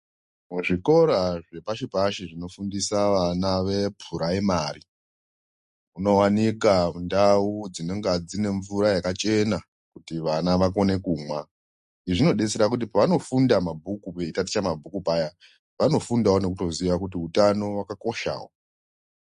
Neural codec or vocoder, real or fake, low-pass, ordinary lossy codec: none; real; 14.4 kHz; MP3, 48 kbps